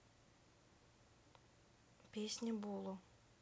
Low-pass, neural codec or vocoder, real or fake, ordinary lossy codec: none; none; real; none